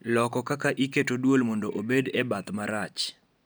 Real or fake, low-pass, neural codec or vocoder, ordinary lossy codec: real; none; none; none